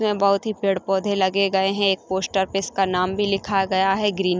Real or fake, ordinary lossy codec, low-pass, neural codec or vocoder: real; none; none; none